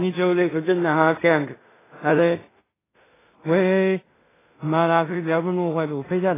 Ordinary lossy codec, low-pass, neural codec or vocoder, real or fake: AAC, 16 kbps; 3.6 kHz; codec, 16 kHz in and 24 kHz out, 0.4 kbps, LongCat-Audio-Codec, two codebook decoder; fake